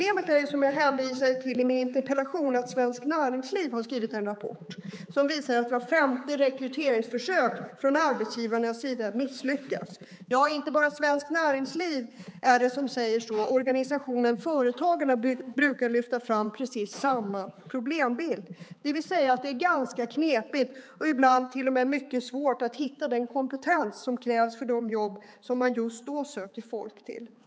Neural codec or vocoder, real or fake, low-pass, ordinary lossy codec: codec, 16 kHz, 4 kbps, X-Codec, HuBERT features, trained on balanced general audio; fake; none; none